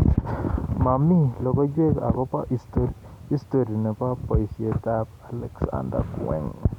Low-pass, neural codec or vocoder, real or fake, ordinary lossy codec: 19.8 kHz; none; real; none